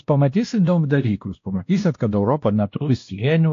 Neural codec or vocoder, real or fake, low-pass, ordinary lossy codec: codec, 16 kHz, 1 kbps, X-Codec, WavLM features, trained on Multilingual LibriSpeech; fake; 7.2 kHz; AAC, 48 kbps